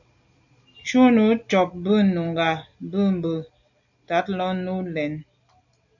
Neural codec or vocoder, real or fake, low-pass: none; real; 7.2 kHz